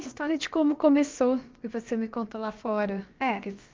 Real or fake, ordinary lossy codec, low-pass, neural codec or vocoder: fake; Opus, 32 kbps; 7.2 kHz; codec, 16 kHz, about 1 kbps, DyCAST, with the encoder's durations